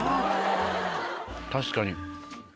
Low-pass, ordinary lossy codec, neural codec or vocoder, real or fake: none; none; none; real